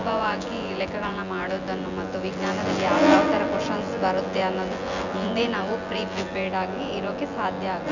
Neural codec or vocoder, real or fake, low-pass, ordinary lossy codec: vocoder, 24 kHz, 100 mel bands, Vocos; fake; 7.2 kHz; none